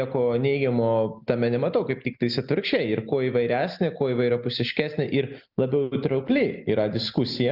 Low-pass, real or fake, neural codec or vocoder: 5.4 kHz; real; none